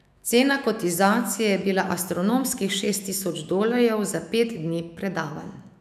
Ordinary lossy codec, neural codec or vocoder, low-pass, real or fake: none; autoencoder, 48 kHz, 128 numbers a frame, DAC-VAE, trained on Japanese speech; 14.4 kHz; fake